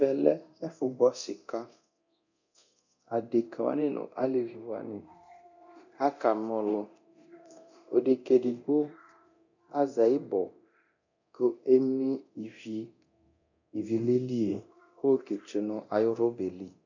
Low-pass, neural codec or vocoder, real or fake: 7.2 kHz; codec, 24 kHz, 0.9 kbps, DualCodec; fake